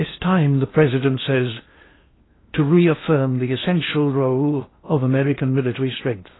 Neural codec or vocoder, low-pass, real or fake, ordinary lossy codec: codec, 16 kHz in and 24 kHz out, 0.6 kbps, FocalCodec, streaming, 2048 codes; 7.2 kHz; fake; AAC, 16 kbps